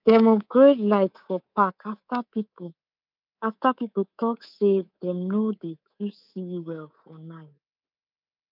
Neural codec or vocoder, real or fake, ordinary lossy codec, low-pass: codec, 24 kHz, 3.1 kbps, DualCodec; fake; none; 5.4 kHz